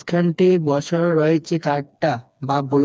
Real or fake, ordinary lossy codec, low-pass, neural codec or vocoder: fake; none; none; codec, 16 kHz, 2 kbps, FreqCodec, smaller model